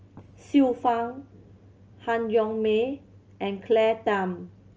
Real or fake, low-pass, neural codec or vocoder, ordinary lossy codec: real; 7.2 kHz; none; Opus, 24 kbps